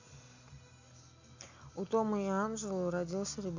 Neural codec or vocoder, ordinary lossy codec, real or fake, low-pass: none; Opus, 64 kbps; real; 7.2 kHz